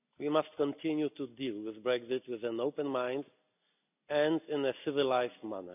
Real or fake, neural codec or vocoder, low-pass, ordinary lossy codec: real; none; 3.6 kHz; none